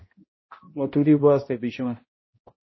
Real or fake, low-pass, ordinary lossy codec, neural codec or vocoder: fake; 7.2 kHz; MP3, 24 kbps; codec, 16 kHz, 0.5 kbps, X-Codec, HuBERT features, trained on balanced general audio